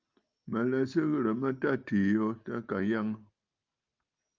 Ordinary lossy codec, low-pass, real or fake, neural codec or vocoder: Opus, 24 kbps; 7.2 kHz; real; none